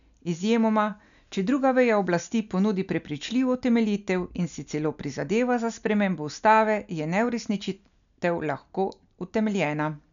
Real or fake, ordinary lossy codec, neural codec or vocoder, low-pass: real; none; none; 7.2 kHz